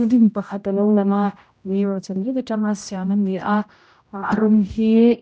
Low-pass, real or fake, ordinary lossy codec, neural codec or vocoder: none; fake; none; codec, 16 kHz, 0.5 kbps, X-Codec, HuBERT features, trained on general audio